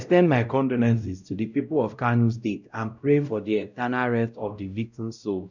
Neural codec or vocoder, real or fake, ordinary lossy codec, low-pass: codec, 16 kHz, 0.5 kbps, X-Codec, WavLM features, trained on Multilingual LibriSpeech; fake; none; 7.2 kHz